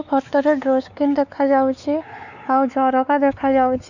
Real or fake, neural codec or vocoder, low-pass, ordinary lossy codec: fake; codec, 16 kHz, 4 kbps, X-Codec, WavLM features, trained on Multilingual LibriSpeech; 7.2 kHz; none